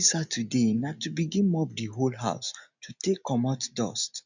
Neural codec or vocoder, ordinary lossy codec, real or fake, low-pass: none; none; real; 7.2 kHz